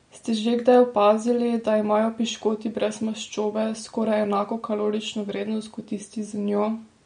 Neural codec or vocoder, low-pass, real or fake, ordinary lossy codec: none; 9.9 kHz; real; MP3, 48 kbps